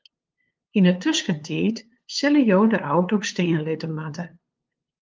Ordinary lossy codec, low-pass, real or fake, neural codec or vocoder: Opus, 24 kbps; 7.2 kHz; fake; codec, 16 kHz, 2 kbps, FunCodec, trained on LibriTTS, 25 frames a second